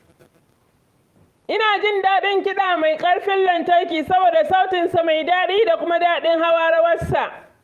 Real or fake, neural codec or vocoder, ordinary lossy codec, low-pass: fake; vocoder, 44.1 kHz, 128 mel bands every 512 samples, BigVGAN v2; Opus, 32 kbps; 14.4 kHz